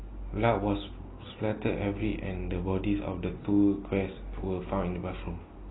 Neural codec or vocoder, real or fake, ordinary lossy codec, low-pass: none; real; AAC, 16 kbps; 7.2 kHz